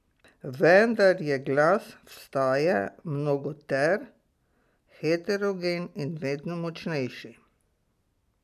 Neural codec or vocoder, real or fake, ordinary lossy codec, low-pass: none; real; MP3, 96 kbps; 14.4 kHz